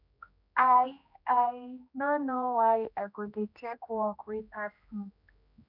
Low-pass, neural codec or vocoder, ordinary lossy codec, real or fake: 5.4 kHz; codec, 16 kHz, 1 kbps, X-Codec, HuBERT features, trained on general audio; none; fake